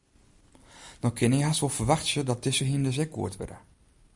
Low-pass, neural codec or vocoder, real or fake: 10.8 kHz; none; real